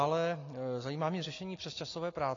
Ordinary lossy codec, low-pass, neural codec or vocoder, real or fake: AAC, 32 kbps; 7.2 kHz; none; real